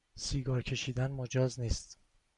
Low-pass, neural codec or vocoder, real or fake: 10.8 kHz; vocoder, 44.1 kHz, 128 mel bands every 256 samples, BigVGAN v2; fake